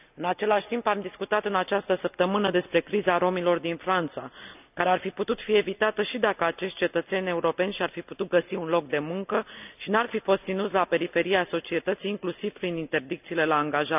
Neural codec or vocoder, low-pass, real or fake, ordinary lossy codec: none; 3.6 kHz; real; none